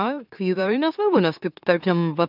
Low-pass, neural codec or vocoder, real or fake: 5.4 kHz; autoencoder, 44.1 kHz, a latent of 192 numbers a frame, MeloTTS; fake